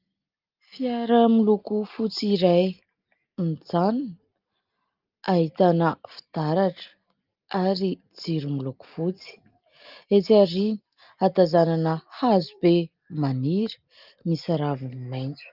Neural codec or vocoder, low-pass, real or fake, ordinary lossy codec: none; 5.4 kHz; real; Opus, 32 kbps